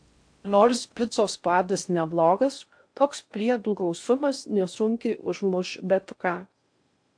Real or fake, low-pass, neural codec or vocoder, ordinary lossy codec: fake; 9.9 kHz; codec, 16 kHz in and 24 kHz out, 0.6 kbps, FocalCodec, streaming, 4096 codes; AAC, 64 kbps